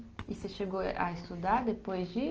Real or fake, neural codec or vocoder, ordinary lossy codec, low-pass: real; none; Opus, 24 kbps; 7.2 kHz